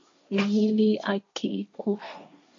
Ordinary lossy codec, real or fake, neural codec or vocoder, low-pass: AAC, 48 kbps; fake; codec, 16 kHz, 1.1 kbps, Voila-Tokenizer; 7.2 kHz